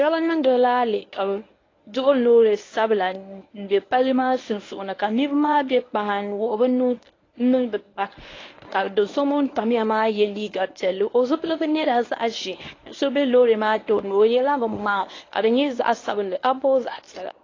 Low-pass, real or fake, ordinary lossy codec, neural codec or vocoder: 7.2 kHz; fake; AAC, 32 kbps; codec, 24 kHz, 0.9 kbps, WavTokenizer, medium speech release version 1